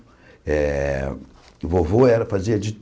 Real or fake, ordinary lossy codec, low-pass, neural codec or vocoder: real; none; none; none